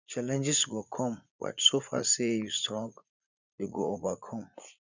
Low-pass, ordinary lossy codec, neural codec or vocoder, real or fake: 7.2 kHz; none; vocoder, 44.1 kHz, 80 mel bands, Vocos; fake